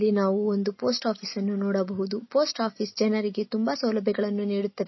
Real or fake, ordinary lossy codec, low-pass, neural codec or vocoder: real; MP3, 24 kbps; 7.2 kHz; none